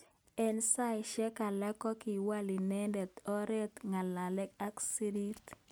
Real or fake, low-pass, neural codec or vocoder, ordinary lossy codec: real; none; none; none